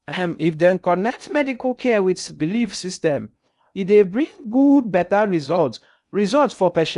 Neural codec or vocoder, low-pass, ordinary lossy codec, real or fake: codec, 16 kHz in and 24 kHz out, 0.6 kbps, FocalCodec, streaming, 2048 codes; 10.8 kHz; none; fake